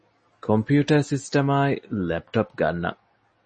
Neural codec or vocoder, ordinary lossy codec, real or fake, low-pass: none; MP3, 32 kbps; real; 10.8 kHz